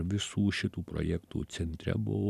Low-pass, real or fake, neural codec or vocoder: 14.4 kHz; real; none